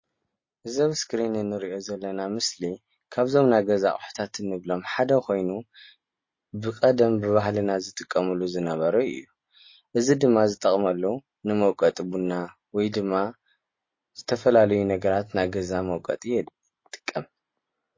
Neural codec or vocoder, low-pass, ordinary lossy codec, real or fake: none; 7.2 kHz; MP3, 32 kbps; real